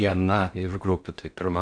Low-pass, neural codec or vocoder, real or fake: 9.9 kHz; codec, 16 kHz in and 24 kHz out, 0.6 kbps, FocalCodec, streaming, 4096 codes; fake